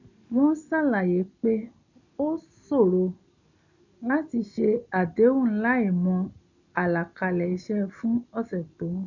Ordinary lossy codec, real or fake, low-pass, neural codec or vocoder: none; real; 7.2 kHz; none